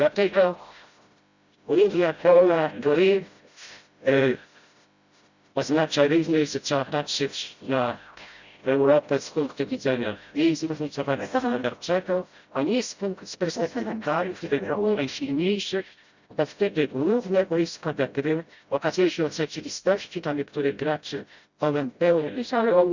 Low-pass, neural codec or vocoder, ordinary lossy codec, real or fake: 7.2 kHz; codec, 16 kHz, 0.5 kbps, FreqCodec, smaller model; Opus, 64 kbps; fake